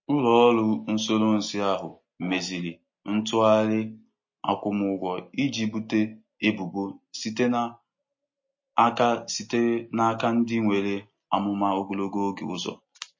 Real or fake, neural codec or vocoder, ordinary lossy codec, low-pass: real; none; MP3, 32 kbps; 7.2 kHz